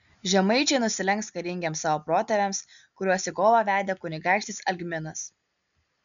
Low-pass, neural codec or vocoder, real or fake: 7.2 kHz; none; real